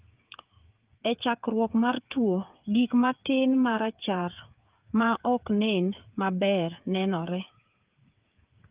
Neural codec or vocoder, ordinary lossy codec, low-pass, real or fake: codec, 16 kHz, 8 kbps, FreqCodec, smaller model; Opus, 32 kbps; 3.6 kHz; fake